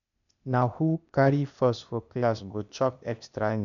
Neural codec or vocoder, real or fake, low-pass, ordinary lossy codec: codec, 16 kHz, 0.8 kbps, ZipCodec; fake; 7.2 kHz; none